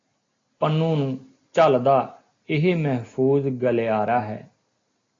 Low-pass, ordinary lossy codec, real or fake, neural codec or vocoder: 7.2 kHz; AAC, 32 kbps; real; none